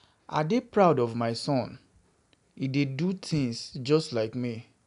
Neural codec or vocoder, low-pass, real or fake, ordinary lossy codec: none; 10.8 kHz; real; none